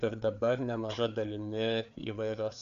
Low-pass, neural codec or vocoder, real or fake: 7.2 kHz; codec, 16 kHz, 4 kbps, FreqCodec, larger model; fake